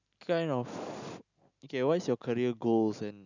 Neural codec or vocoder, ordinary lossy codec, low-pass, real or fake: none; none; 7.2 kHz; real